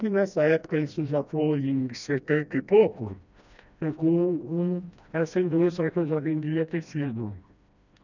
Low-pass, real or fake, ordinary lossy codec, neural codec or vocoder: 7.2 kHz; fake; none; codec, 16 kHz, 1 kbps, FreqCodec, smaller model